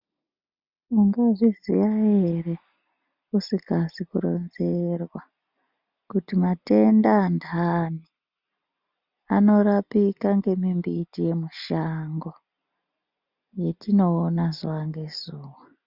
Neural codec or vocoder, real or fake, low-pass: none; real; 5.4 kHz